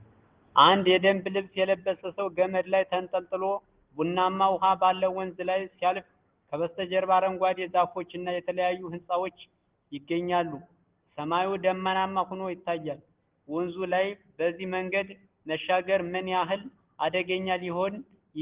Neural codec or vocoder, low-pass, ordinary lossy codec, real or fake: none; 3.6 kHz; Opus, 16 kbps; real